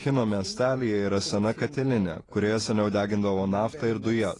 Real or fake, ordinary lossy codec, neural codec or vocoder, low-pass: fake; AAC, 32 kbps; vocoder, 48 kHz, 128 mel bands, Vocos; 10.8 kHz